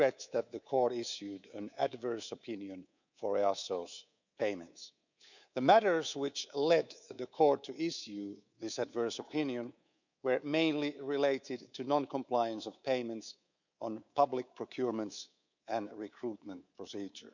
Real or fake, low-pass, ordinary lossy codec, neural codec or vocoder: fake; 7.2 kHz; none; codec, 24 kHz, 3.1 kbps, DualCodec